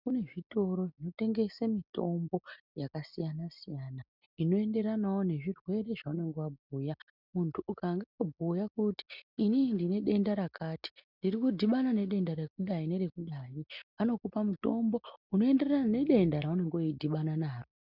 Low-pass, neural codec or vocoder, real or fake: 5.4 kHz; none; real